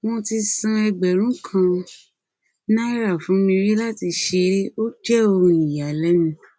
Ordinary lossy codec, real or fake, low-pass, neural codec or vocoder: none; real; none; none